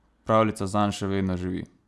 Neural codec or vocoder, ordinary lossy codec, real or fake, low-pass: none; none; real; none